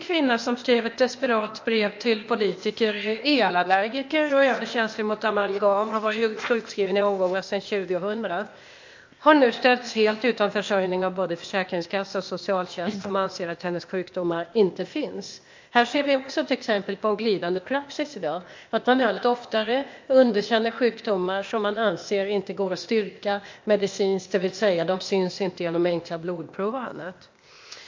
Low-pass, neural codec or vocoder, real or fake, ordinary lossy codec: 7.2 kHz; codec, 16 kHz, 0.8 kbps, ZipCodec; fake; MP3, 48 kbps